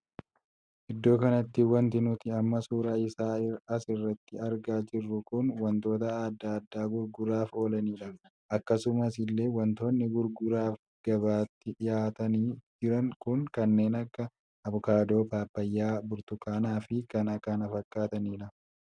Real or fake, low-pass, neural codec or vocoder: real; 9.9 kHz; none